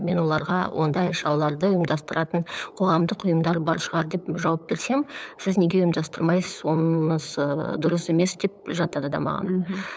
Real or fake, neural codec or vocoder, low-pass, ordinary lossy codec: fake; codec, 16 kHz, 8 kbps, FunCodec, trained on LibriTTS, 25 frames a second; none; none